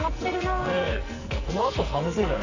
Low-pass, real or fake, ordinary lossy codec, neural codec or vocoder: 7.2 kHz; fake; none; codec, 44.1 kHz, 2.6 kbps, SNAC